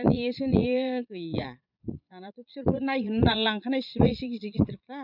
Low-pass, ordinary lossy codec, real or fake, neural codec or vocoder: 5.4 kHz; none; fake; vocoder, 22.05 kHz, 80 mel bands, Vocos